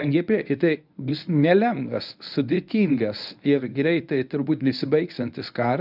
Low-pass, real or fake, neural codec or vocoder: 5.4 kHz; fake; codec, 24 kHz, 0.9 kbps, WavTokenizer, medium speech release version 1